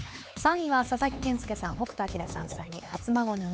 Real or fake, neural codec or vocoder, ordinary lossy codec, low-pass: fake; codec, 16 kHz, 4 kbps, X-Codec, HuBERT features, trained on LibriSpeech; none; none